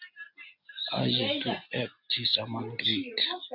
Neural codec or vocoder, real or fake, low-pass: none; real; 5.4 kHz